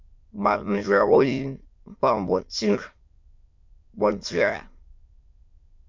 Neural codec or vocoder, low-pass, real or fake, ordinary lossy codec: autoencoder, 22.05 kHz, a latent of 192 numbers a frame, VITS, trained on many speakers; 7.2 kHz; fake; MP3, 48 kbps